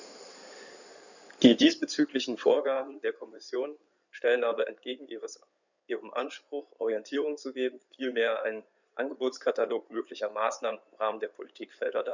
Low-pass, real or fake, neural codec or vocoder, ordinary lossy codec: 7.2 kHz; fake; codec, 16 kHz in and 24 kHz out, 2.2 kbps, FireRedTTS-2 codec; none